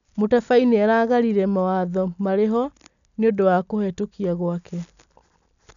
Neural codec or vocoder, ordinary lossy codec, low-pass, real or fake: none; none; 7.2 kHz; real